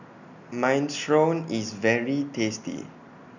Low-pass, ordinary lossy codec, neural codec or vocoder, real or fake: 7.2 kHz; none; none; real